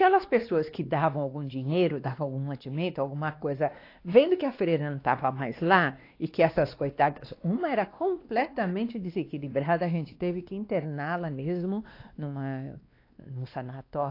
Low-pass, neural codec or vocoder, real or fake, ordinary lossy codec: 5.4 kHz; codec, 16 kHz, 2 kbps, X-Codec, WavLM features, trained on Multilingual LibriSpeech; fake; AAC, 32 kbps